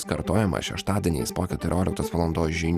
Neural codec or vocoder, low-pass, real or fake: none; 14.4 kHz; real